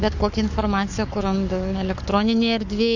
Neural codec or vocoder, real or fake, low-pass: codec, 44.1 kHz, 7.8 kbps, Pupu-Codec; fake; 7.2 kHz